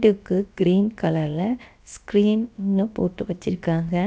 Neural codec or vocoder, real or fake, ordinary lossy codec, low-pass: codec, 16 kHz, about 1 kbps, DyCAST, with the encoder's durations; fake; none; none